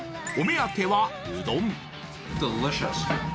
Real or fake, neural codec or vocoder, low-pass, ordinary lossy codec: real; none; none; none